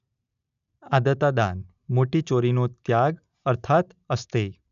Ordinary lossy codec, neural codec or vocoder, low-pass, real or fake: none; none; 7.2 kHz; real